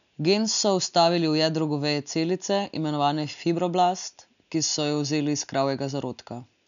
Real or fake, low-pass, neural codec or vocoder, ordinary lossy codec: real; 7.2 kHz; none; none